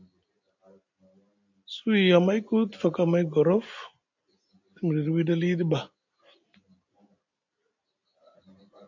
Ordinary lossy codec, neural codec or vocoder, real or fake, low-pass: AAC, 48 kbps; none; real; 7.2 kHz